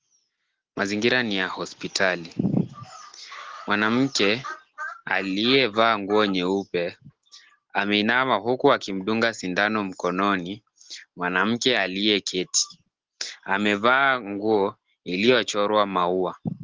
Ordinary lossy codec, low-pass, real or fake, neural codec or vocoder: Opus, 16 kbps; 7.2 kHz; real; none